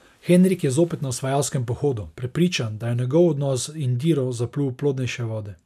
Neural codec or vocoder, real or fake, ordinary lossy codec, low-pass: none; real; none; 14.4 kHz